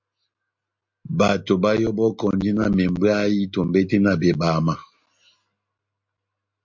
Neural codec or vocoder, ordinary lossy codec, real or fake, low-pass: none; MP3, 48 kbps; real; 7.2 kHz